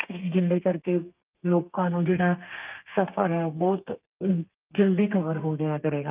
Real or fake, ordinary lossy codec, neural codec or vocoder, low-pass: fake; Opus, 24 kbps; codec, 32 kHz, 1.9 kbps, SNAC; 3.6 kHz